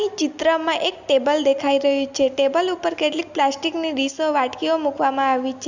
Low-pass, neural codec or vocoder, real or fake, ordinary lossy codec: 7.2 kHz; none; real; Opus, 64 kbps